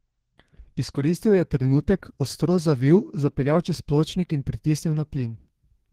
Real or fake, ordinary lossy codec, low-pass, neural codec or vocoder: fake; Opus, 16 kbps; 14.4 kHz; codec, 32 kHz, 1.9 kbps, SNAC